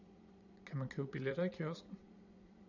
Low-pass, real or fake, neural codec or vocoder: 7.2 kHz; fake; vocoder, 22.05 kHz, 80 mel bands, Vocos